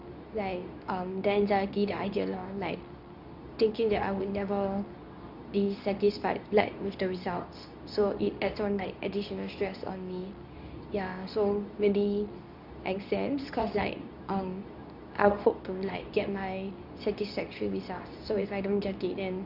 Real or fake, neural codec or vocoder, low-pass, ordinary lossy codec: fake; codec, 24 kHz, 0.9 kbps, WavTokenizer, medium speech release version 2; 5.4 kHz; none